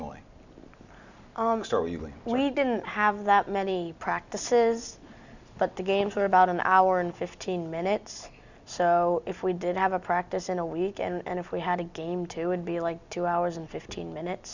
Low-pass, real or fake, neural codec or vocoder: 7.2 kHz; real; none